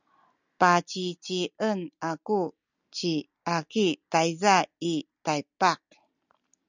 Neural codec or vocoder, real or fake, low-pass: none; real; 7.2 kHz